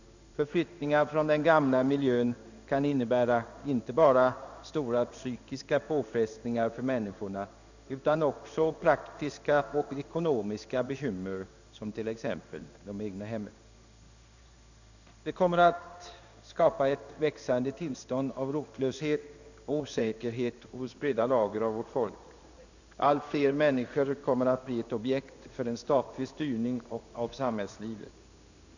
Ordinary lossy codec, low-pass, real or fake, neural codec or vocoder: none; 7.2 kHz; fake; codec, 16 kHz in and 24 kHz out, 1 kbps, XY-Tokenizer